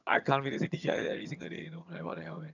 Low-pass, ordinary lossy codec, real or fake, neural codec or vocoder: 7.2 kHz; none; fake; vocoder, 22.05 kHz, 80 mel bands, HiFi-GAN